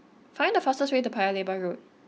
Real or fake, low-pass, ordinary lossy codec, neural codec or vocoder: real; none; none; none